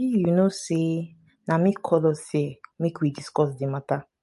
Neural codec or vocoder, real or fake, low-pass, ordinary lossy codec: none; real; 14.4 kHz; MP3, 48 kbps